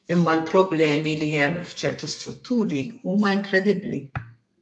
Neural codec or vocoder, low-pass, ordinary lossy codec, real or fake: codec, 32 kHz, 1.9 kbps, SNAC; 10.8 kHz; AAC, 64 kbps; fake